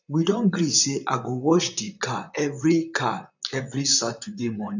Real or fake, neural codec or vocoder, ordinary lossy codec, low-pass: fake; vocoder, 44.1 kHz, 128 mel bands, Pupu-Vocoder; none; 7.2 kHz